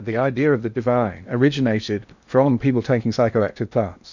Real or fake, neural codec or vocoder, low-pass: fake; codec, 16 kHz in and 24 kHz out, 0.8 kbps, FocalCodec, streaming, 65536 codes; 7.2 kHz